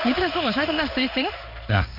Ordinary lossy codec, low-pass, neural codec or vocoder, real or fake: none; 5.4 kHz; codec, 16 kHz in and 24 kHz out, 1 kbps, XY-Tokenizer; fake